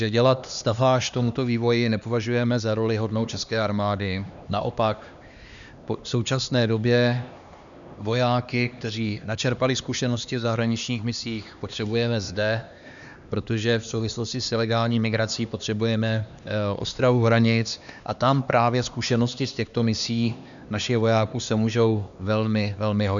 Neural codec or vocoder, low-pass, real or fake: codec, 16 kHz, 2 kbps, X-Codec, HuBERT features, trained on LibriSpeech; 7.2 kHz; fake